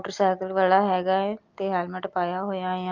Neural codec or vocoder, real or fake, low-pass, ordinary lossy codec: none; real; 7.2 kHz; Opus, 24 kbps